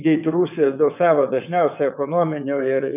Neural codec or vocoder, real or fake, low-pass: vocoder, 22.05 kHz, 80 mel bands, WaveNeXt; fake; 3.6 kHz